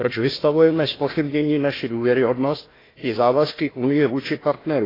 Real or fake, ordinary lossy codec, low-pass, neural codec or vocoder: fake; AAC, 24 kbps; 5.4 kHz; codec, 16 kHz, 1 kbps, FunCodec, trained on Chinese and English, 50 frames a second